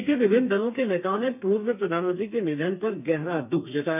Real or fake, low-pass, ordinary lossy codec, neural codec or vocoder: fake; 3.6 kHz; none; codec, 32 kHz, 1.9 kbps, SNAC